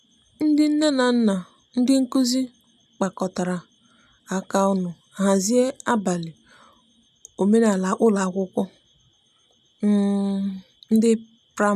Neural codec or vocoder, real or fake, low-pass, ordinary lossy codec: none; real; 14.4 kHz; none